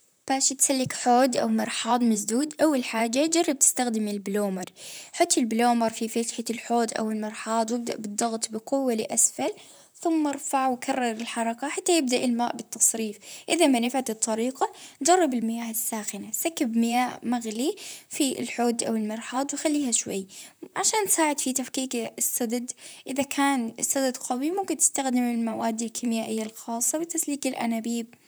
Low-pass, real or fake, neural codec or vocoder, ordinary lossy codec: none; fake; vocoder, 44.1 kHz, 128 mel bands, Pupu-Vocoder; none